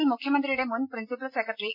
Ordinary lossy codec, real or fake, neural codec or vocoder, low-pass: none; real; none; 5.4 kHz